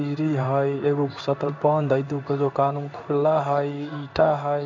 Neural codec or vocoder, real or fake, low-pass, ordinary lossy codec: codec, 16 kHz in and 24 kHz out, 1 kbps, XY-Tokenizer; fake; 7.2 kHz; none